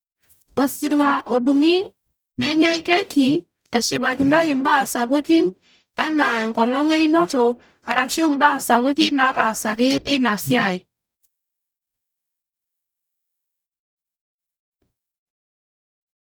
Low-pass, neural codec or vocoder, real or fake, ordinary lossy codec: none; codec, 44.1 kHz, 0.9 kbps, DAC; fake; none